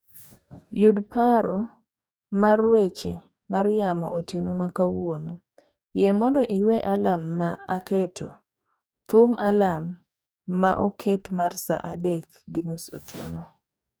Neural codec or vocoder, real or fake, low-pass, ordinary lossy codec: codec, 44.1 kHz, 2.6 kbps, DAC; fake; none; none